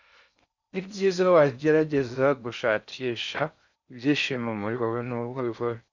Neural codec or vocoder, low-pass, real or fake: codec, 16 kHz in and 24 kHz out, 0.6 kbps, FocalCodec, streaming, 4096 codes; 7.2 kHz; fake